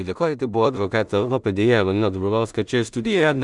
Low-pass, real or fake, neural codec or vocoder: 10.8 kHz; fake; codec, 16 kHz in and 24 kHz out, 0.4 kbps, LongCat-Audio-Codec, two codebook decoder